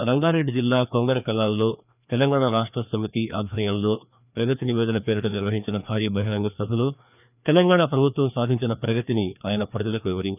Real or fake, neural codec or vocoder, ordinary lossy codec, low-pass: fake; codec, 16 kHz, 2 kbps, FreqCodec, larger model; none; 3.6 kHz